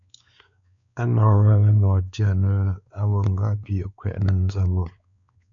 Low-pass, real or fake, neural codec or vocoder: 7.2 kHz; fake; codec, 16 kHz, 4 kbps, X-Codec, WavLM features, trained on Multilingual LibriSpeech